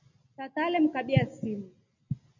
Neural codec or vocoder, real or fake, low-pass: none; real; 7.2 kHz